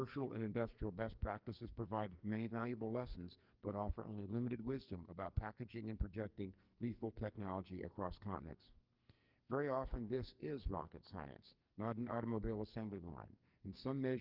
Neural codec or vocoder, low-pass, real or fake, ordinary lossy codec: codec, 44.1 kHz, 2.6 kbps, SNAC; 5.4 kHz; fake; Opus, 32 kbps